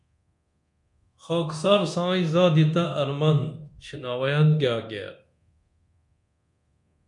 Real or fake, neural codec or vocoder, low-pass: fake; codec, 24 kHz, 0.9 kbps, DualCodec; 10.8 kHz